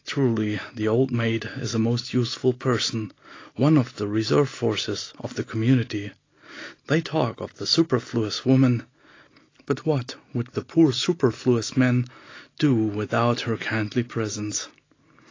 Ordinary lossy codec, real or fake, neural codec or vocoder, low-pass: AAC, 32 kbps; real; none; 7.2 kHz